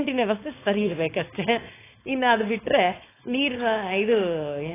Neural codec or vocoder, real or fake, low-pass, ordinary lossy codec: codec, 16 kHz, 4.8 kbps, FACodec; fake; 3.6 kHz; AAC, 16 kbps